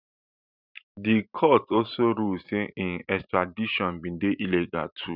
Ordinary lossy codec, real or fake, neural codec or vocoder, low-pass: none; fake; vocoder, 44.1 kHz, 128 mel bands every 512 samples, BigVGAN v2; 5.4 kHz